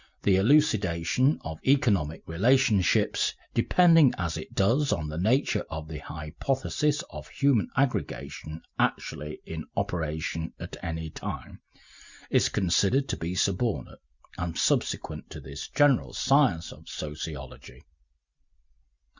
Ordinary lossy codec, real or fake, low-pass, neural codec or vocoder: Opus, 64 kbps; real; 7.2 kHz; none